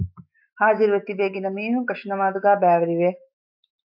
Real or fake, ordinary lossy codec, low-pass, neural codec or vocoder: fake; AAC, 48 kbps; 5.4 kHz; autoencoder, 48 kHz, 128 numbers a frame, DAC-VAE, trained on Japanese speech